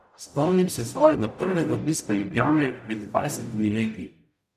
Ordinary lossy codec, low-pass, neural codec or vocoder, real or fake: none; 14.4 kHz; codec, 44.1 kHz, 0.9 kbps, DAC; fake